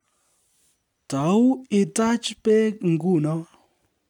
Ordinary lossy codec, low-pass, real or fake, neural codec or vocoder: none; 19.8 kHz; real; none